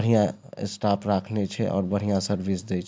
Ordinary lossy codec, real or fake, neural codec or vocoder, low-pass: none; real; none; none